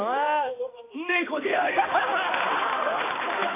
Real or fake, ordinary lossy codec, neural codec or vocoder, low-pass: fake; MP3, 24 kbps; codec, 16 kHz, 2 kbps, X-Codec, HuBERT features, trained on balanced general audio; 3.6 kHz